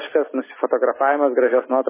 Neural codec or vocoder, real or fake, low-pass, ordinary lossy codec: none; real; 3.6 kHz; MP3, 16 kbps